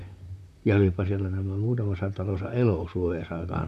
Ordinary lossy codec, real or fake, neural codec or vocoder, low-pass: none; fake; vocoder, 44.1 kHz, 128 mel bands, Pupu-Vocoder; 14.4 kHz